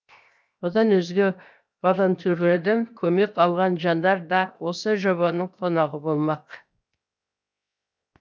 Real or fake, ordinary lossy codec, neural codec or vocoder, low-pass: fake; none; codec, 16 kHz, 0.7 kbps, FocalCodec; none